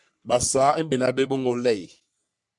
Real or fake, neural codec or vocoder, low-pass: fake; codec, 44.1 kHz, 3.4 kbps, Pupu-Codec; 10.8 kHz